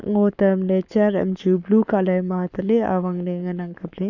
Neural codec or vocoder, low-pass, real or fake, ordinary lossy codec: codec, 44.1 kHz, 7.8 kbps, Pupu-Codec; 7.2 kHz; fake; none